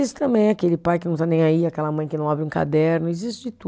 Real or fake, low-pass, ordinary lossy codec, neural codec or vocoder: real; none; none; none